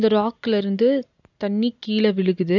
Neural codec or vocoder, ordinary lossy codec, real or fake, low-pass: none; none; real; 7.2 kHz